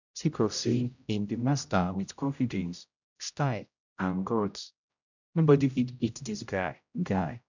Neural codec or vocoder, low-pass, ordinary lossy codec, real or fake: codec, 16 kHz, 0.5 kbps, X-Codec, HuBERT features, trained on general audio; 7.2 kHz; none; fake